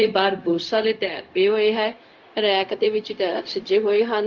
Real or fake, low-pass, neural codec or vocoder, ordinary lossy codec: fake; 7.2 kHz; codec, 16 kHz, 0.4 kbps, LongCat-Audio-Codec; Opus, 32 kbps